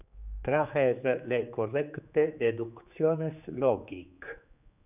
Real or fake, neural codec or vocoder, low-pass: fake; codec, 16 kHz, 4 kbps, X-Codec, HuBERT features, trained on general audio; 3.6 kHz